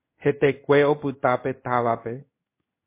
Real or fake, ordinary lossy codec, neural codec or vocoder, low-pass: fake; MP3, 24 kbps; codec, 16 kHz in and 24 kHz out, 1 kbps, XY-Tokenizer; 3.6 kHz